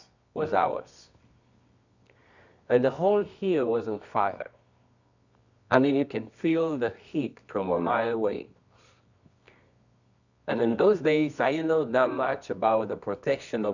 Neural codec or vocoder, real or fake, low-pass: codec, 24 kHz, 0.9 kbps, WavTokenizer, medium music audio release; fake; 7.2 kHz